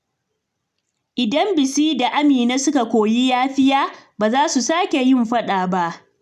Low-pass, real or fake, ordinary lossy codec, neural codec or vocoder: 14.4 kHz; real; none; none